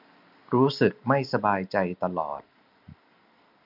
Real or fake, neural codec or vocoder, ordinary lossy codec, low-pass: real; none; none; 5.4 kHz